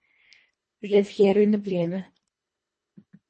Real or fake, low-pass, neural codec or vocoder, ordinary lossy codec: fake; 10.8 kHz; codec, 24 kHz, 1.5 kbps, HILCodec; MP3, 32 kbps